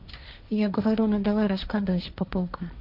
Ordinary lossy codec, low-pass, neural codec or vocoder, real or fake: none; 5.4 kHz; codec, 16 kHz, 1.1 kbps, Voila-Tokenizer; fake